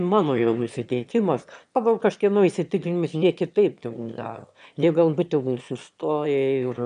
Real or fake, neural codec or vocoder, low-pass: fake; autoencoder, 22.05 kHz, a latent of 192 numbers a frame, VITS, trained on one speaker; 9.9 kHz